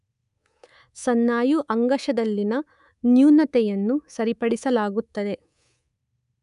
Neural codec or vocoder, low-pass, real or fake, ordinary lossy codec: codec, 24 kHz, 3.1 kbps, DualCodec; 10.8 kHz; fake; none